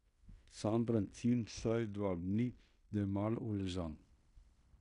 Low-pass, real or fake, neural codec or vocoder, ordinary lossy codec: 10.8 kHz; fake; codec, 16 kHz in and 24 kHz out, 0.9 kbps, LongCat-Audio-Codec, fine tuned four codebook decoder; none